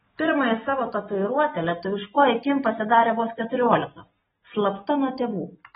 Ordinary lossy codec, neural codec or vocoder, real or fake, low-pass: AAC, 16 kbps; none; real; 19.8 kHz